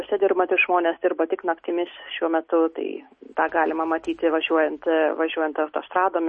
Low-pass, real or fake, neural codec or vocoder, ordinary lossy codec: 9.9 kHz; real; none; MP3, 32 kbps